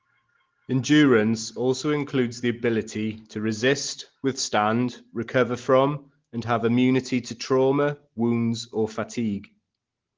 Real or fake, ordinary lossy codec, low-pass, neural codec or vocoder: real; Opus, 16 kbps; 7.2 kHz; none